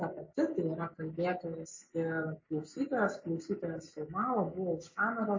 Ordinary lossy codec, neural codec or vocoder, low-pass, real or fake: MP3, 32 kbps; none; 7.2 kHz; real